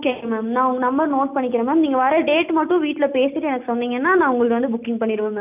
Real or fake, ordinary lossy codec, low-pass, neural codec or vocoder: real; none; 3.6 kHz; none